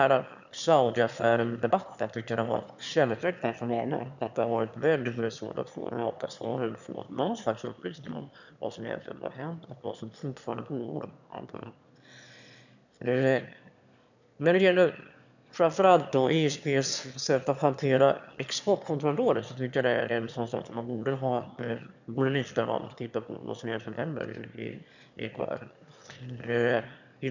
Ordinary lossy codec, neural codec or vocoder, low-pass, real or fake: none; autoencoder, 22.05 kHz, a latent of 192 numbers a frame, VITS, trained on one speaker; 7.2 kHz; fake